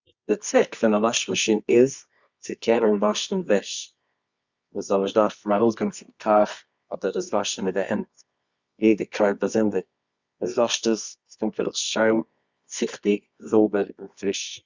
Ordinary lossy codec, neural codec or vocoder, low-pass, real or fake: Opus, 64 kbps; codec, 24 kHz, 0.9 kbps, WavTokenizer, medium music audio release; 7.2 kHz; fake